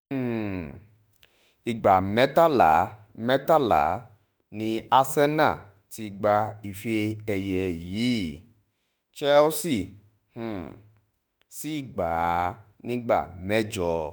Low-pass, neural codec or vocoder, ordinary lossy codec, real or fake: none; autoencoder, 48 kHz, 32 numbers a frame, DAC-VAE, trained on Japanese speech; none; fake